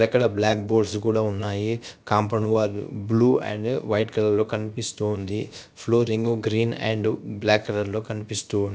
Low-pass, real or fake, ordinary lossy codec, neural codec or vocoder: none; fake; none; codec, 16 kHz, about 1 kbps, DyCAST, with the encoder's durations